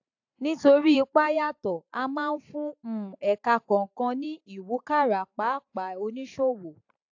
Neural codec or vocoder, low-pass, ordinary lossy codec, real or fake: vocoder, 44.1 kHz, 128 mel bands every 512 samples, BigVGAN v2; 7.2 kHz; AAC, 48 kbps; fake